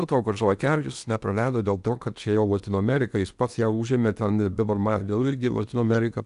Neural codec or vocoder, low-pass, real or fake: codec, 16 kHz in and 24 kHz out, 0.8 kbps, FocalCodec, streaming, 65536 codes; 10.8 kHz; fake